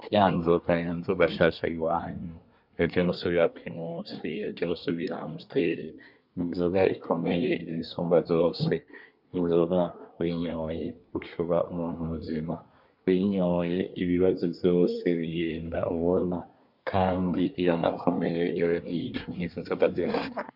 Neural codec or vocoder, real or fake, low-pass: codec, 24 kHz, 1 kbps, SNAC; fake; 5.4 kHz